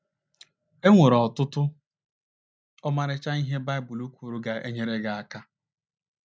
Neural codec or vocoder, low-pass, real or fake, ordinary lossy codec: none; none; real; none